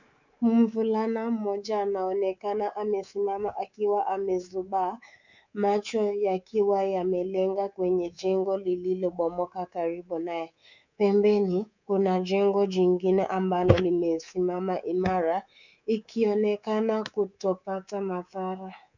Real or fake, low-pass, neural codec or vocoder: fake; 7.2 kHz; codec, 24 kHz, 3.1 kbps, DualCodec